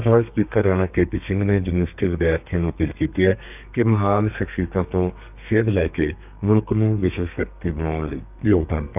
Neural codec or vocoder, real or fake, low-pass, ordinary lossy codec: codec, 32 kHz, 1.9 kbps, SNAC; fake; 3.6 kHz; none